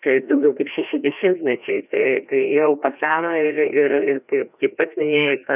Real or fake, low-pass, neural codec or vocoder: fake; 3.6 kHz; codec, 16 kHz, 1 kbps, FreqCodec, larger model